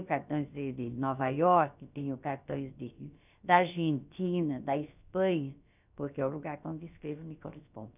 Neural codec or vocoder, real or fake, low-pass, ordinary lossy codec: codec, 16 kHz, about 1 kbps, DyCAST, with the encoder's durations; fake; 3.6 kHz; none